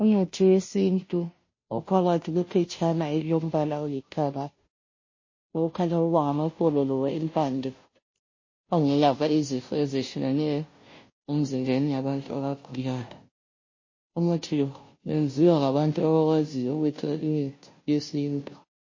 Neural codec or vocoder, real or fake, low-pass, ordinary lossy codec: codec, 16 kHz, 0.5 kbps, FunCodec, trained on Chinese and English, 25 frames a second; fake; 7.2 kHz; MP3, 32 kbps